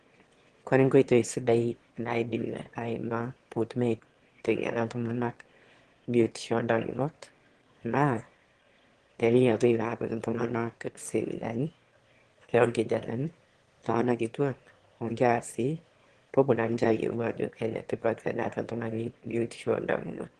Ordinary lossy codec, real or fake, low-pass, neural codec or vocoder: Opus, 16 kbps; fake; 9.9 kHz; autoencoder, 22.05 kHz, a latent of 192 numbers a frame, VITS, trained on one speaker